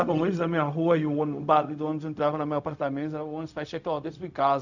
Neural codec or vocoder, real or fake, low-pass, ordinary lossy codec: codec, 16 kHz, 0.4 kbps, LongCat-Audio-Codec; fake; 7.2 kHz; none